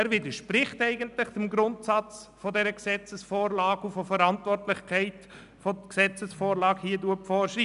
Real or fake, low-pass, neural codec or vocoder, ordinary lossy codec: real; 10.8 kHz; none; none